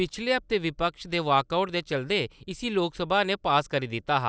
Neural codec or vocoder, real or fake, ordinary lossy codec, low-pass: none; real; none; none